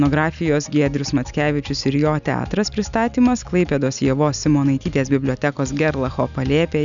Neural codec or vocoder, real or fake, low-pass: none; real; 7.2 kHz